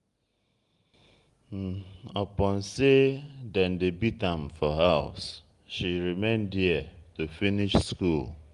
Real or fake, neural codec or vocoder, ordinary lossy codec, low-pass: real; none; Opus, 32 kbps; 10.8 kHz